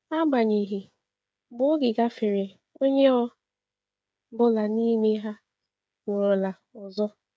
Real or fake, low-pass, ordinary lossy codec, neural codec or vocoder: fake; none; none; codec, 16 kHz, 8 kbps, FreqCodec, smaller model